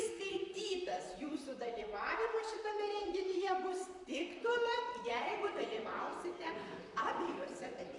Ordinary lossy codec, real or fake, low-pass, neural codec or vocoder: AAC, 64 kbps; fake; 10.8 kHz; vocoder, 44.1 kHz, 128 mel bands, Pupu-Vocoder